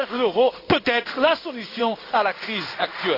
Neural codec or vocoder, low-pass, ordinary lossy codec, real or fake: codec, 16 kHz in and 24 kHz out, 1 kbps, XY-Tokenizer; 5.4 kHz; AAC, 32 kbps; fake